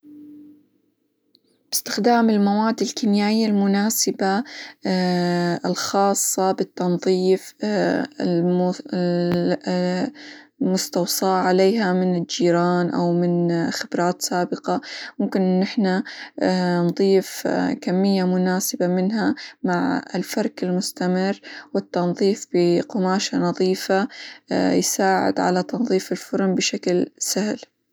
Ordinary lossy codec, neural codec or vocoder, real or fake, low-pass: none; none; real; none